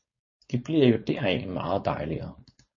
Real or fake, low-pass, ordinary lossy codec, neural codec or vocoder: fake; 7.2 kHz; MP3, 32 kbps; codec, 16 kHz, 4.8 kbps, FACodec